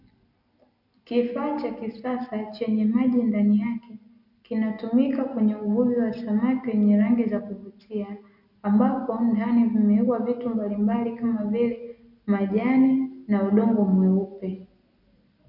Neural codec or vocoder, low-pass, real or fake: none; 5.4 kHz; real